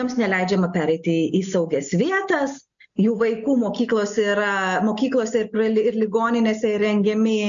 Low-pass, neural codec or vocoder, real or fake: 7.2 kHz; none; real